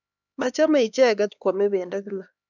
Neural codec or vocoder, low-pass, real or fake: codec, 16 kHz, 2 kbps, X-Codec, HuBERT features, trained on LibriSpeech; 7.2 kHz; fake